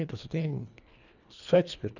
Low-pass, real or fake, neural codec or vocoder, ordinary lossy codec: 7.2 kHz; fake; codec, 24 kHz, 1.5 kbps, HILCodec; none